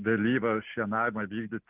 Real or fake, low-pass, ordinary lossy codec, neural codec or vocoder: real; 3.6 kHz; Opus, 32 kbps; none